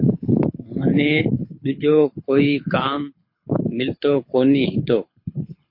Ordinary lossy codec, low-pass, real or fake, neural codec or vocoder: MP3, 32 kbps; 5.4 kHz; fake; codec, 24 kHz, 6 kbps, HILCodec